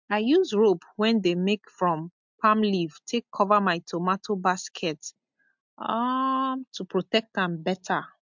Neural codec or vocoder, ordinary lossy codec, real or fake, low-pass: none; MP3, 64 kbps; real; 7.2 kHz